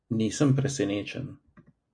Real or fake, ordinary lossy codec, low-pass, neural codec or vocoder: real; MP3, 64 kbps; 9.9 kHz; none